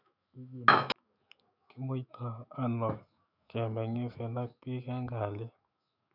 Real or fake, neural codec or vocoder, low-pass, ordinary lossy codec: fake; autoencoder, 48 kHz, 128 numbers a frame, DAC-VAE, trained on Japanese speech; 5.4 kHz; none